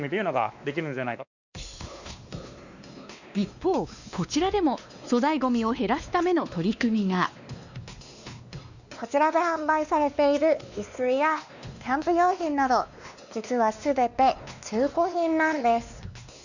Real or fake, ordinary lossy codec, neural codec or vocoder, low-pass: fake; none; codec, 16 kHz, 2 kbps, X-Codec, WavLM features, trained on Multilingual LibriSpeech; 7.2 kHz